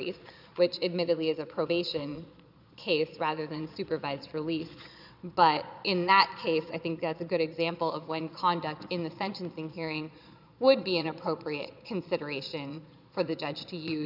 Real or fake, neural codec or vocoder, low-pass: fake; vocoder, 22.05 kHz, 80 mel bands, Vocos; 5.4 kHz